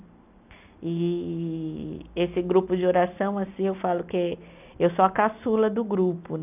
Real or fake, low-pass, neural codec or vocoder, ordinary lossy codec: real; 3.6 kHz; none; none